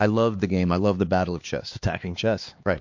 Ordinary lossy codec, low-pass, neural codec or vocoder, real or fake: MP3, 48 kbps; 7.2 kHz; codec, 16 kHz, 2 kbps, X-Codec, HuBERT features, trained on LibriSpeech; fake